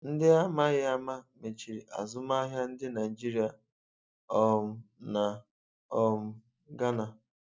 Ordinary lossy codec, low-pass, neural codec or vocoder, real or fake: none; none; none; real